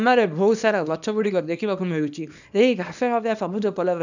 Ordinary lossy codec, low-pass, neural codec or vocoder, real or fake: none; 7.2 kHz; codec, 24 kHz, 0.9 kbps, WavTokenizer, small release; fake